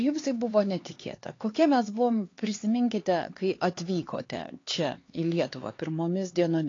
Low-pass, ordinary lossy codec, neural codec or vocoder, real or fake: 7.2 kHz; AAC, 32 kbps; codec, 16 kHz, 4 kbps, X-Codec, HuBERT features, trained on LibriSpeech; fake